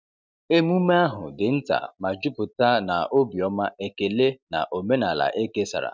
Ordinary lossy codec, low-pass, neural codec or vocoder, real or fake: none; none; none; real